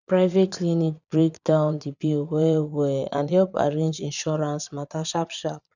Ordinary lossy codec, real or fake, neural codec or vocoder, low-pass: none; fake; vocoder, 22.05 kHz, 80 mel bands, Vocos; 7.2 kHz